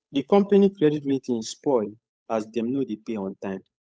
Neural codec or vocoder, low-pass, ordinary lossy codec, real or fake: codec, 16 kHz, 8 kbps, FunCodec, trained on Chinese and English, 25 frames a second; none; none; fake